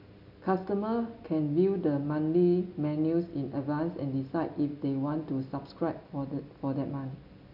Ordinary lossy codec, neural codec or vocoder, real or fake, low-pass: none; none; real; 5.4 kHz